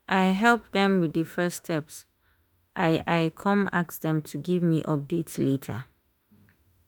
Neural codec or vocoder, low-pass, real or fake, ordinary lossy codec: autoencoder, 48 kHz, 32 numbers a frame, DAC-VAE, trained on Japanese speech; none; fake; none